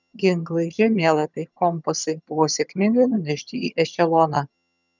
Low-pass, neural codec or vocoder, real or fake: 7.2 kHz; vocoder, 22.05 kHz, 80 mel bands, HiFi-GAN; fake